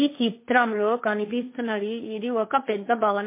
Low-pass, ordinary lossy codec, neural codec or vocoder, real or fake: 3.6 kHz; MP3, 24 kbps; codec, 16 kHz, 1.1 kbps, Voila-Tokenizer; fake